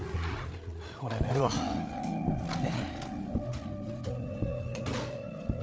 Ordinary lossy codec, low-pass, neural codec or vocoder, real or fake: none; none; codec, 16 kHz, 8 kbps, FreqCodec, larger model; fake